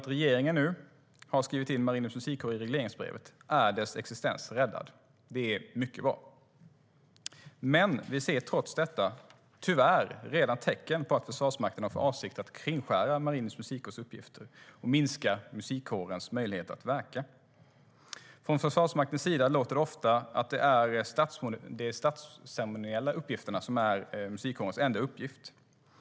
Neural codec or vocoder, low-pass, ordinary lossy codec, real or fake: none; none; none; real